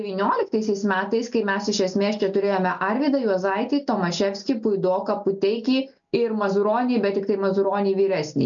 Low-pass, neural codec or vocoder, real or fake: 7.2 kHz; none; real